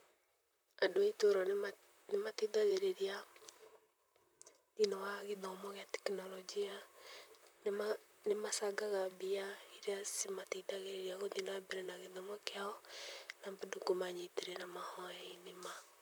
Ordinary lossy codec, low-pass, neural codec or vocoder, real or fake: none; none; vocoder, 44.1 kHz, 128 mel bands, Pupu-Vocoder; fake